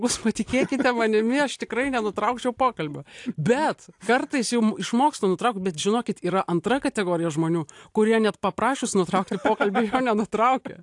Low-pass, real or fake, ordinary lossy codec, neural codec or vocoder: 10.8 kHz; real; AAC, 64 kbps; none